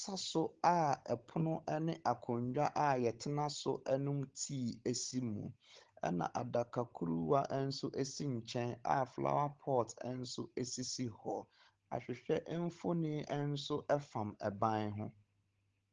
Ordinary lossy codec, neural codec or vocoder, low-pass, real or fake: Opus, 16 kbps; codec, 16 kHz, 16 kbps, FunCodec, trained on Chinese and English, 50 frames a second; 7.2 kHz; fake